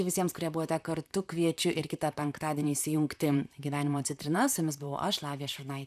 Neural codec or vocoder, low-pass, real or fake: vocoder, 44.1 kHz, 128 mel bands, Pupu-Vocoder; 14.4 kHz; fake